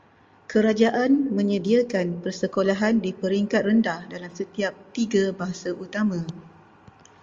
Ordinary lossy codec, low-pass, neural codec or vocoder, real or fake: Opus, 32 kbps; 7.2 kHz; none; real